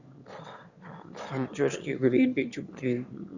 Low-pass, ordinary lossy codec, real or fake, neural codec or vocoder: 7.2 kHz; Opus, 64 kbps; fake; autoencoder, 22.05 kHz, a latent of 192 numbers a frame, VITS, trained on one speaker